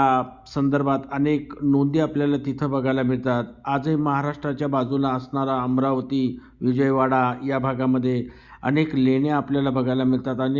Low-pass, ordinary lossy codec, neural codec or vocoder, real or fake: 7.2 kHz; Opus, 64 kbps; none; real